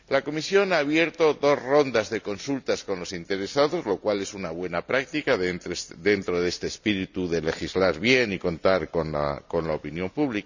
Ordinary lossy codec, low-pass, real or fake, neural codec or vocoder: none; 7.2 kHz; real; none